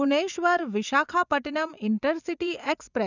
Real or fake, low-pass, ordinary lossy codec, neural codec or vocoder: fake; 7.2 kHz; none; vocoder, 24 kHz, 100 mel bands, Vocos